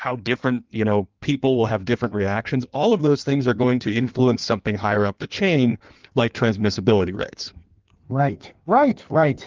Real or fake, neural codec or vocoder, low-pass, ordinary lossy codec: fake; codec, 16 kHz in and 24 kHz out, 1.1 kbps, FireRedTTS-2 codec; 7.2 kHz; Opus, 32 kbps